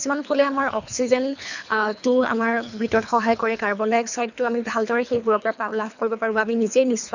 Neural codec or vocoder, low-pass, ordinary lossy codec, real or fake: codec, 24 kHz, 3 kbps, HILCodec; 7.2 kHz; none; fake